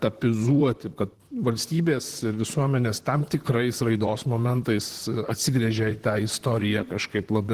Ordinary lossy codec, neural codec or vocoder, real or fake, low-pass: Opus, 16 kbps; vocoder, 44.1 kHz, 128 mel bands, Pupu-Vocoder; fake; 14.4 kHz